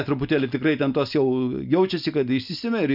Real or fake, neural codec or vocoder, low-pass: real; none; 5.4 kHz